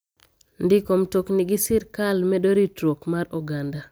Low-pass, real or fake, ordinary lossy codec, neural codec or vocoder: none; real; none; none